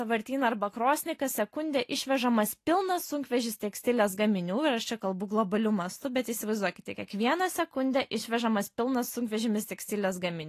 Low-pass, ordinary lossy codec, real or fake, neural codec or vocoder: 14.4 kHz; AAC, 48 kbps; real; none